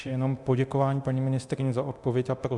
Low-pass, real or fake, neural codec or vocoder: 10.8 kHz; fake; codec, 24 kHz, 0.9 kbps, DualCodec